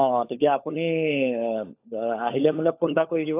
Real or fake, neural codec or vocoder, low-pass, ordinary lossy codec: fake; codec, 16 kHz, 16 kbps, FunCodec, trained on LibriTTS, 50 frames a second; 3.6 kHz; AAC, 24 kbps